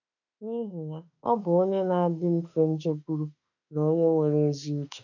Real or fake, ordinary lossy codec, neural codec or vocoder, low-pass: fake; none; autoencoder, 48 kHz, 32 numbers a frame, DAC-VAE, trained on Japanese speech; 7.2 kHz